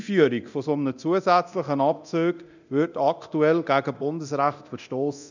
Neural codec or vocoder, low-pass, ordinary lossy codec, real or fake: codec, 24 kHz, 0.9 kbps, DualCodec; 7.2 kHz; none; fake